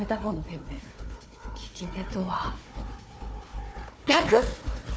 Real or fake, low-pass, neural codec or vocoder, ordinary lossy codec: fake; none; codec, 16 kHz, 4 kbps, FunCodec, trained on Chinese and English, 50 frames a second; none